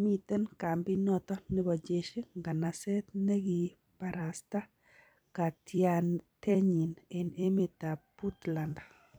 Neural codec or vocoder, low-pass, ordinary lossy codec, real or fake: vocoder, 44.1 kHz, 128 mel bands every 256 samples, BigVGAN v2; none; none; fake